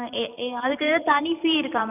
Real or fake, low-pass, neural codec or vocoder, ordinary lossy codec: fake; 3.6 kHz; vocoder, 44.1 kHz, 128 mel bands every 512 samples, BigVGAN v2; none